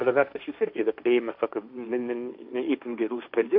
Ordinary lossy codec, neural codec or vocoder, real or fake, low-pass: MP3, 48 kbps; codec, 16 kHz, 1.1 kbps, Voila-Tokenizer; fake; 5.4 kHz